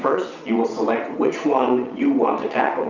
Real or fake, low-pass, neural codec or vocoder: fake; 7.2 kHz; vocoder, 22.05 kHz, 80 mel bands, WaveNeXt